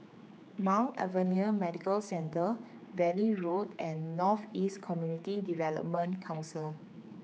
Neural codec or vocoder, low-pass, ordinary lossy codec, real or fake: codec, 16 kHz, 4 kbps, X-Codec, HuBERT features, trained on general audio; none; none; fake